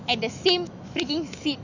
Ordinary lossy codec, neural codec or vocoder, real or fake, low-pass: none; autoencoder, 48 kHz, 128 numbers a frame, DAC-VAE, trained on Japanese speech; fake; 7.2 kHz